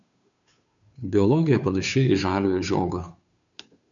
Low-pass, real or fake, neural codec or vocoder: 7.2 kHz; fake; codec, 16 kHz, 2 kbps, FunCodec, trained on Chinese and English, 25 frames a second